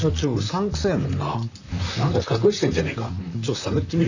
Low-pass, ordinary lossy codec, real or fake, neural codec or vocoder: 7.2 kHz; none; fake; vocoder, 44.1 kHz, 128 mel bands, Pupu-Vocoder